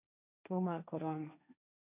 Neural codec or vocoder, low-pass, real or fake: codec, 16 kHz, 1.1 kbps, Voila-Tokenizer; 3.6 kHz; fake